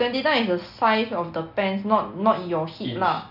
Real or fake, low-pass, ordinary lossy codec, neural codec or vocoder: real; 5.4 kHz; none; none